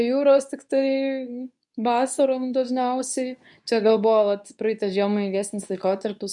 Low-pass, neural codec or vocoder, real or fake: 10.8 kHz; codec, 24 kHz, 0.9 kbps, WavTokenizer, medium speech release version 2; fake